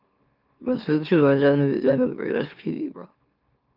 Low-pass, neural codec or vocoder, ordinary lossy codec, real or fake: 5.4 kHz; autoencoder, 44.1 kHz, a latent of 192 numbers a frame, MeloTTS; Opus, 24 kbps; fake